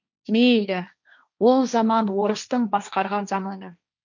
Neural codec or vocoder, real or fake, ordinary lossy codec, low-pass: codec, 16 kHz, 1.1 kbps, Voila-Tokenizer; fake; none; 7.2 kHz